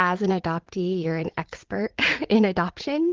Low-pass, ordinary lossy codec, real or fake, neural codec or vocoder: 7.2 kHz; Opus, 16 kbps; fake; vocoder, 44.1 kHz, 80 mel bands, Vocos